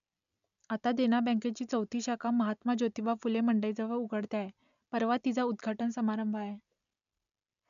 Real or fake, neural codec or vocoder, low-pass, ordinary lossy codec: real; none; 7.2 kHz; none